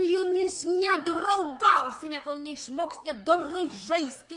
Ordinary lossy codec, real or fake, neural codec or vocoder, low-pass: AAC, 64 kbps; fake; codec, 24 kHz, 1 kbps, SNAC; 10.8 kHz